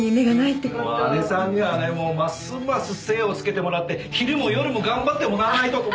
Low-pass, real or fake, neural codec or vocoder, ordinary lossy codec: none; real; none; none